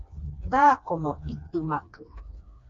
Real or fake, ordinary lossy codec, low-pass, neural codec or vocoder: fake; MP3, 48 kbps; 7.2 kHz; codec, 16 kHz, 2 kbps, FreqCodec, smaller model